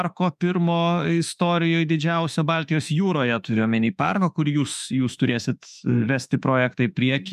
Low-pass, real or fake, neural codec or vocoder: 14.4 kHz; fake; autoencoder, 48 kHz, 32 numbers a frame, DAC-VAE, trained on Japanese speech